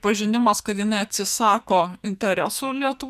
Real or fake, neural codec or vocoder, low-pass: fake; codec, 44.1 kHz, 2.6 kbps, SNAC; 14.4 kHz